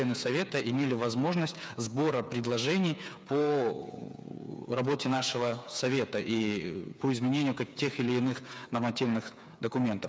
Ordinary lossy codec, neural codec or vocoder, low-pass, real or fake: none; codec, 16 kHz, 8 kbps, FreqCodec, smaller model; none; fake